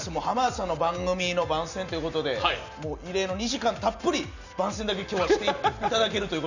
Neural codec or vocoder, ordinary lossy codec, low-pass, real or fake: none; none; 7.2 kHz; real